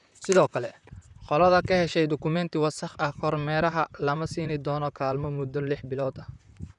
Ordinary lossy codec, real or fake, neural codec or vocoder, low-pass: none; fake; vocoder, 44.1 kHz, 128 mel bands, Pupu-Vocoder; 10.8 kHz